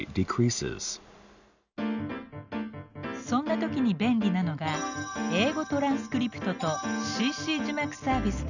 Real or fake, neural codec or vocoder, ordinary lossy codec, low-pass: real; none; none; 7.2 kHz